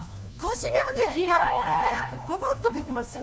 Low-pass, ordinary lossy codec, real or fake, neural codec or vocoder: none; none; fake; codec, 16 kHz, 1 kbps, FunCodec, trained on LibriTTS, 50 frames a second